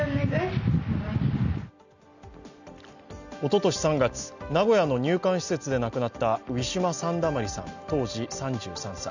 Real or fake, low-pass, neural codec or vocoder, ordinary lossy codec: real; 7.2 kHz; none; none